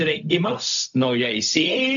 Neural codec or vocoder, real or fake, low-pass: codec, 16 kHz, 0.4 kbps, LongCat-Audio-Codec; fake; 7.2 kHz